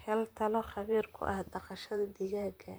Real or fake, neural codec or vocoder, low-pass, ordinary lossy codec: fake; vocoder, 44.1 kHz, 128 mel bands, Pupu-Vocoder; none; none